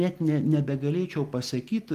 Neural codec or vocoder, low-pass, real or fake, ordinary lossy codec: none; 14.4 kHz; real; Opus, 24 kbps